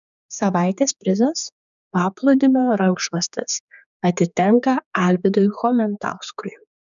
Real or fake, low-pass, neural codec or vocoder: fake; 7.2 kHz; codec, 16 kHz, 4 kbps, X-Codec, HuBERT features, trained on general audio